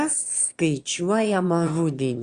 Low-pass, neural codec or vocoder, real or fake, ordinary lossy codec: 9.9 kHz; autoencoder, 22.05 kHz, a latent of 192 numbers a frame, VITS, trained on one speaker; fake; Opus, 64 kbps